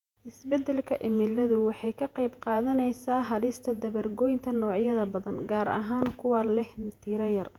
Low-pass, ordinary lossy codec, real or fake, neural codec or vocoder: 19.8 kHz; none; fake; vocoder, 48 kHz, 128 mel bands, Vocos